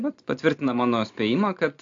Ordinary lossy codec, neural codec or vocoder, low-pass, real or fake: AAC, 48 kbps; none; 7.2 kHz; real